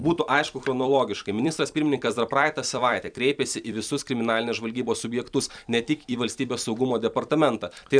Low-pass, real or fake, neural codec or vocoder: 9.9 kHz; fake; vocoder, 44.1 kHz, 128 mel bands every 256 samples, BigVGAN v2